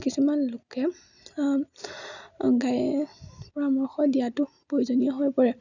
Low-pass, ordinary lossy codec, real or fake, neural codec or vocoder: 7.2 kHz; none; real; none